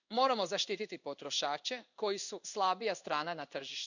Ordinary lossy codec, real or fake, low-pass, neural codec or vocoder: none; fake; 7.2 kHz; codec, 16 kHz in and 24 kHz out, 1 kbps, XY-Tokenizer